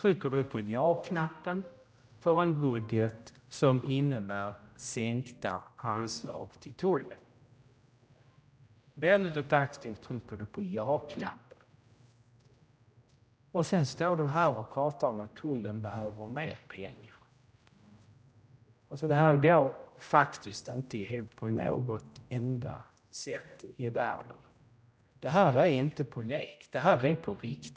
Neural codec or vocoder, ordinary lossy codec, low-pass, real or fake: codec, 16 kHz, 0.5 kbps, X-Codec, HuBERT features, trained on general audio; none; none; fake